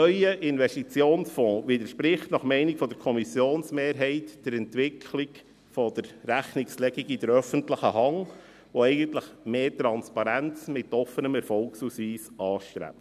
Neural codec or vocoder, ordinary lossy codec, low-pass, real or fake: none; none; 14.4 kHz; real